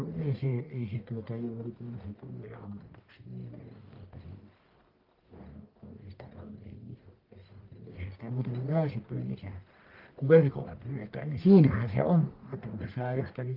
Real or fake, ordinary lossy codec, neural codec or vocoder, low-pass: fake; Opus, 24 kbps; codec, 44.1 kHz, 1.7 kbps, Pupu-Codec; 5.4 kHz